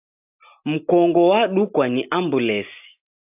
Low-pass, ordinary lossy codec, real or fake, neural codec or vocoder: 3.6 kHz; AAC, 32 kbps; real; none